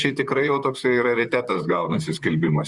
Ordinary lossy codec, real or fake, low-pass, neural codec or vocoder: Opus, 64 kbps; fake; 10.8 kHz; vocoder, 44.1 kHz, 128 mel bands, Pupu-Vocoder